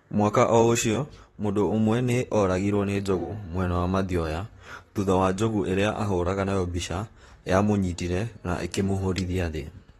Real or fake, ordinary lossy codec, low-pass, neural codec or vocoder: fake; AAC, 32 kbps; 19.8 kHz; vocoder, 44.1 kHz, 128 mel bands, Pupu-Vocoder